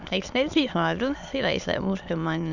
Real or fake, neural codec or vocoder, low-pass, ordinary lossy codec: fake; autoencoder, 22.05 kHz, a latent of 192 numbers a frame, VITS, trained on many speakers; 7.2 kHz; none